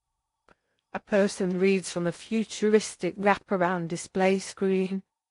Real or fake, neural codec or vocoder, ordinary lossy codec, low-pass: fake; codec, 16 kHz in and 24 kHz out, 0.6 kbps, FocalCodec, streaming, 4096 codes; AAC, 48 kbps; 10.8 kHz